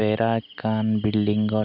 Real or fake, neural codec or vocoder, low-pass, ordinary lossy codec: real; none; 5.4 kHz; none